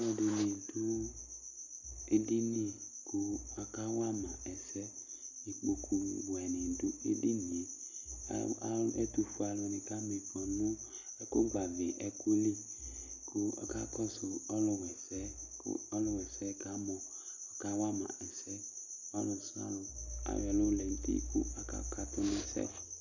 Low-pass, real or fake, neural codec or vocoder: 7.2 kHz; real; none